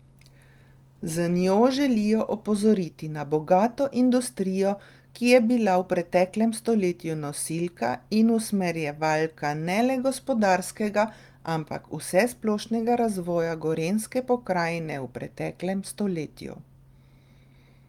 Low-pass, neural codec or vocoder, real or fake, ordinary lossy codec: 19.8 kHz; none; real; Opus, 32 kbps